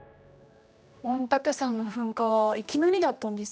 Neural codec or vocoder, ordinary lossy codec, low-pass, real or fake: codec, 16 kHz, 1 kbps, X-Codec, HuBERT features, trained on general audio; none; none; fake